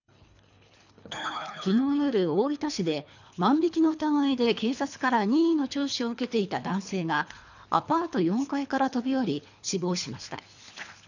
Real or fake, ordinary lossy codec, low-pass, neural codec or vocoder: fake; none; 7.2 kHz; codec, 24 kHz, 3 kbps, HILCodec